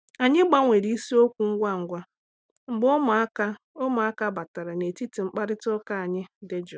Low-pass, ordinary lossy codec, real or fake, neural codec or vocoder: none; none; real; none